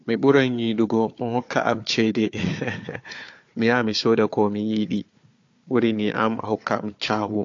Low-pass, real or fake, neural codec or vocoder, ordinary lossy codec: 7.2 kHz; fake; codec, 16 kHz, 4 kbps, FunCodec, trained on Chinese and English, 50 frames a second; AAC, 48 kbps